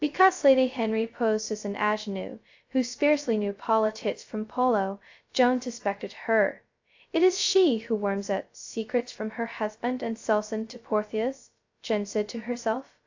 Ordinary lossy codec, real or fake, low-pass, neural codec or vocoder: AAC, 48 kbps; fake; 7.2 kHz; codec, 16 kHz, 0.2 kbps, FocalCodec